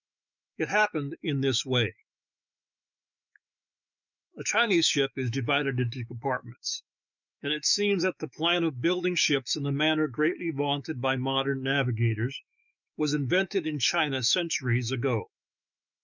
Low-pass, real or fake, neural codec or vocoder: 7.2 kHz; fake; autoencoder, 48 kHz, 128 numbers a frame, DAC-VAE, trained on Japanese speech